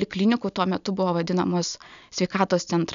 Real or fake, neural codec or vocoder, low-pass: real; none; 7.2 kHz